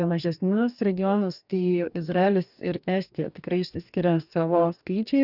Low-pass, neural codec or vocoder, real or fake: 5.4 kHz; codec, 44.1 kHz, 2.6 kbps, DAC; fake